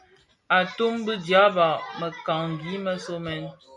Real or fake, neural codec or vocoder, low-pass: real; none; 10.8 kHz